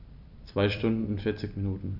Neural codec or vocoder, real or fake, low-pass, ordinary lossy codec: vocoder, 44.1 kHz, 128 mel bands every 256 samples, BigVGAN v2; fake; 5.4 kHz; none